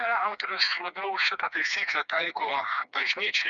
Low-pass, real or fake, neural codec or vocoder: 7.2 kHz; fake; codec, 16 kHz, 2 kbps, FreqCodec, smaller model